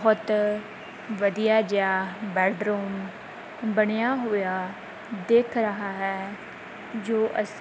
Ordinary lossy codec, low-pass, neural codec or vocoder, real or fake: none; none; none; real